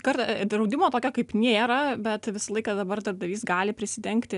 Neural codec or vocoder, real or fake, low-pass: none; real; 10.8 kHz